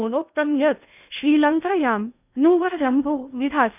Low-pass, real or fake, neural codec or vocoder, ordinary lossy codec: 3.6 kHz; fake; codec, 16 kHz in and 24 kHz out, 0.6 kbps, FocalCodec, streaming, 2048 codes; none